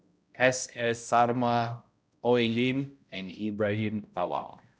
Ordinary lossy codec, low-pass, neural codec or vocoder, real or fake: none; none; codec, 16 kHz, 0.5 kbps, X-Codec, HuBERT features, trained on balanced general audio; fake